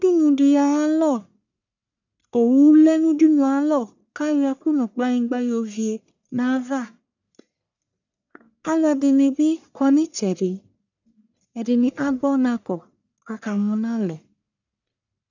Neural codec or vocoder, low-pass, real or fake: codec, 44.1 kHz, 1.7 kbps, Pupu-Codec; 7.2 kHz; fake